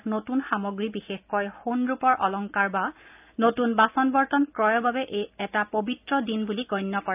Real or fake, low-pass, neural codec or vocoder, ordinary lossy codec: real; 3.6 kHz; none; AAC, 32 kbps